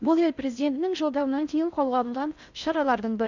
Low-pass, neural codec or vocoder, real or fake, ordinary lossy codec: 7.2 kHz; codec, 16 kHz in and 24 kHz out, 0.6 kbps, FocalCodec, streaming, 4096 codes; fake; none